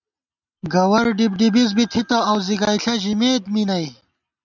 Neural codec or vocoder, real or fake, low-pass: none; real; 7.2 kHz